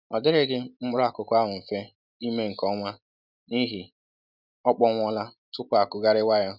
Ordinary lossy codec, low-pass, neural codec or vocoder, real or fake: none; 5.4 kHz; none; real